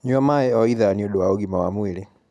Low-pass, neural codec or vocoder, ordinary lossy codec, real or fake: none; none; none; real